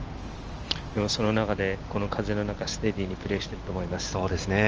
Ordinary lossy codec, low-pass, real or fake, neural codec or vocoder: Opus, 24 kbps; 7.2 kHz; real; none